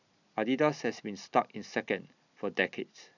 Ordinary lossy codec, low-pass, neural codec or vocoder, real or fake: none; 7.2 kHz; none; real